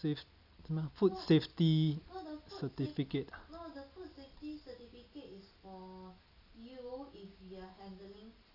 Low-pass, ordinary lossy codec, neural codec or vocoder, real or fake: 5.4 kHz; none; none; real